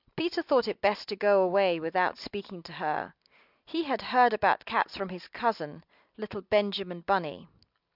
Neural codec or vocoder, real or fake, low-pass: none; real; 5.4 kHz